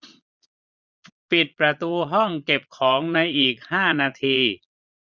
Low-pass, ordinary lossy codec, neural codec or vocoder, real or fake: 7.2 kHz; none; vocoder, 22.05 kHz, 80 mel bands, Vocos; fake